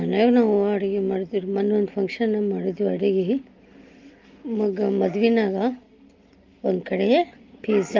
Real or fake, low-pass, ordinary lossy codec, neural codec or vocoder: real; 7.2 kHz; Opus, 32 kbps; none